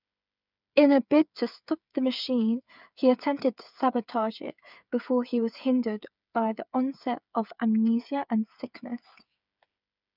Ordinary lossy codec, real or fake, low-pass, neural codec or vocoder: none; fake; 5.4 kHz; codec, 16 kHz, 8 kbps, FreqCodec, smaller model